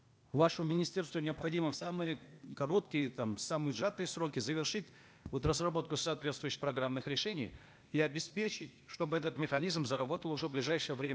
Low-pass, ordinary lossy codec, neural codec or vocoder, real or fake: none; none; codec, 16 kHz, 0.8 kbps, ZipCodec; fake